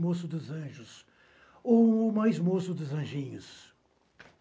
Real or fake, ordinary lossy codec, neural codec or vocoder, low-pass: real; none; none; none